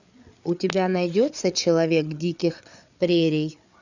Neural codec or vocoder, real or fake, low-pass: codec, 16 kHz, 8 kbps, FreqCodec, larger model; fake; 7.2 kHz